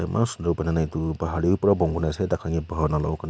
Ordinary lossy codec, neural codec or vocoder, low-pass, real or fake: none; none; none; real